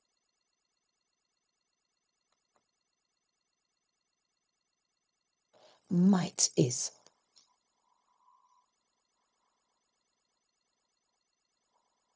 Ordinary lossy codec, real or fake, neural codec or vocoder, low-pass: none; fake; codec, 16 kHz, 0.4 kbps, LongCat-Audio-Codec; none